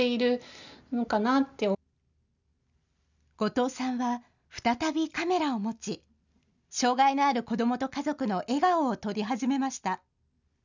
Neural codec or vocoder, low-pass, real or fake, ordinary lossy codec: none; 7.2 kHz; real; none